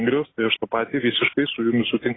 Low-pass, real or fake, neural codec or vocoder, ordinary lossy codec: 7.2 kHz; real; none; AAC, 16 kbps